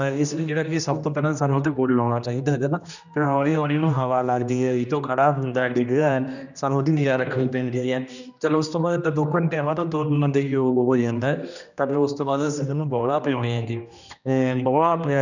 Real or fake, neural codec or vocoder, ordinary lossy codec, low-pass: fake; codec, 16 kHz, 1 kbps, X-Codec, HuBERT features, trained on general audio; none; 7.2 kHz